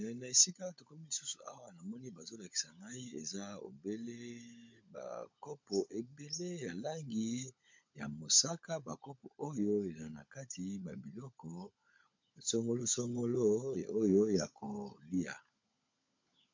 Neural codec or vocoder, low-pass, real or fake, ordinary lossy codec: codec, 16 kHz, 16 kbps, FunCodec, trained on LibriTTS, 50 frames a second; 7.2 kHz; fake; MP3, 48 kbps